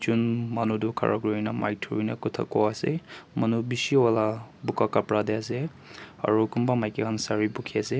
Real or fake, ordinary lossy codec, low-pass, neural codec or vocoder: real; none; none; none